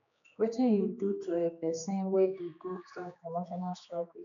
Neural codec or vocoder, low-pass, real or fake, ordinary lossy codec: codec, 16 kHz, 2 kbps, X-Codec, HuBERT features, trained on general audio; 7.2 kHz; fake; none